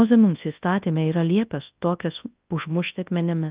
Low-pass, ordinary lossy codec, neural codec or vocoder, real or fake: 3.6 kHz; Opus, 32 kbps; codec, 24 kHz, 0.9 kbps, WavTokenizer, large speech release; fake